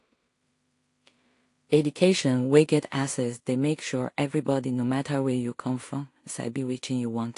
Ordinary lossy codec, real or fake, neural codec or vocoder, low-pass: AAC, 48 kbps; fake; codec, 16 kHz in and 24 kHz out, 0.4 kbps, LongCat-Audio-Codec, two codebook decoder; 10.8 kHz